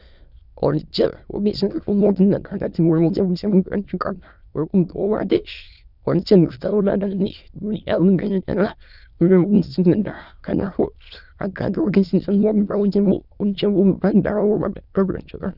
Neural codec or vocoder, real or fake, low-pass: autoencoder, 22.05 kHz, a latent of 192 numbers a frame, VITS, trained on many speakers; fake; 5.4 kHz